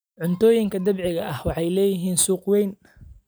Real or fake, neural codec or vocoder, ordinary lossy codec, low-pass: real; none; none; none